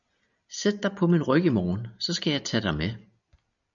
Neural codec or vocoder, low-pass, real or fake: none; 7.2 kHz; real